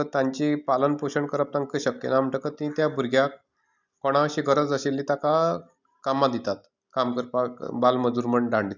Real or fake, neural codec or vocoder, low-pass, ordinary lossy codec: real; none; 7.2 kHz; none